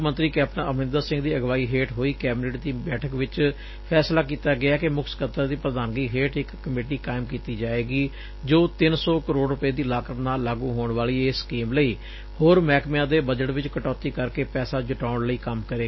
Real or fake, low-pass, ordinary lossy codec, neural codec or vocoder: real; 7.2 kHz; MP3, 24 kbps; none